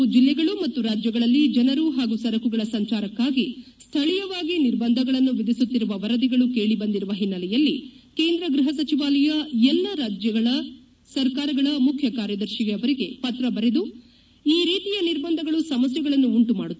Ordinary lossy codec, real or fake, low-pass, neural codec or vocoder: none; real; none; none